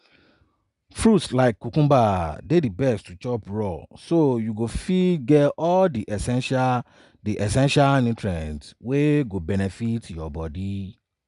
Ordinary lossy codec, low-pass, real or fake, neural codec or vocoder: none; 10.8 kHz; real; none